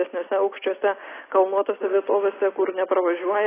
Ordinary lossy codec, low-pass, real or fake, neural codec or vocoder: AAC, 16 kbps; 3.6 kHz; real; none